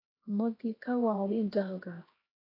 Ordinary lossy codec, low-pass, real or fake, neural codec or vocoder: AAC, 24 kbps; 5.4 kHz; fake; codec, 16 kHz, 1 kbps, X-Codec, HuBERT features, trained on LibriSpeech